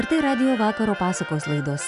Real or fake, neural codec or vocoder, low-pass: real; none; 10.8 kHz